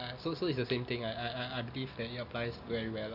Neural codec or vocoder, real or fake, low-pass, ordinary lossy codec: none; real; 5.4 kHz; none